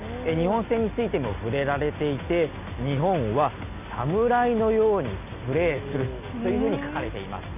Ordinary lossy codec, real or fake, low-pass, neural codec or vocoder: none; real; 3.6 kHz; none